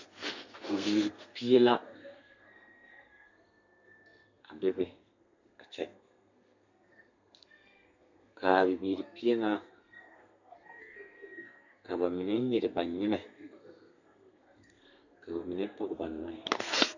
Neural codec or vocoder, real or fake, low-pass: codec, 32 kHz, 1.9 kbps, SNAC; fake; 7.2 kHz